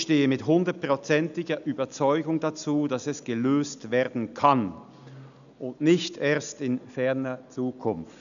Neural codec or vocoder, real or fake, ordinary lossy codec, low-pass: none; real; none; 7.2 kHz